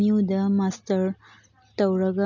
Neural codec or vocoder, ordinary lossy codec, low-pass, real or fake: none; none; 7.2 kHz; real